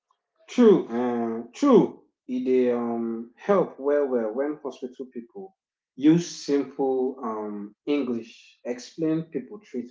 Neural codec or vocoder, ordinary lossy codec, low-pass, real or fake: none; none; none; real